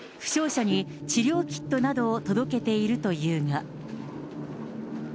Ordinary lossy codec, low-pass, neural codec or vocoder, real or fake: none; none; none; real